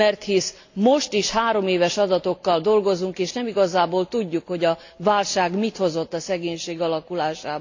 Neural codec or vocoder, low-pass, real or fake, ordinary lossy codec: none; 7.2 kHz; real; AAC, 48 kbps